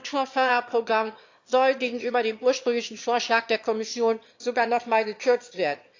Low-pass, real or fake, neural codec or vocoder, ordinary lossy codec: 7.2 kHz; fake; autoencoder, 22.05 kHz, a latent of 192 numbers a frame, VITS, trained on one speaker; AAC, 48 kbps